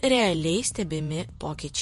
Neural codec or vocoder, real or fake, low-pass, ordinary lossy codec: vocoder, 48 kHz, 128 mel bands, Vocos; fake; 14.4 kHz; MP3, 48 kbps